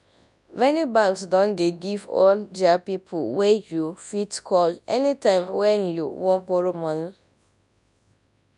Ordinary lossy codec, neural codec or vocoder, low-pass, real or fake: none; codec, 24 kHz, 0.9 kbps, WavTokenizer, large speech release; 10.8 kHz; fake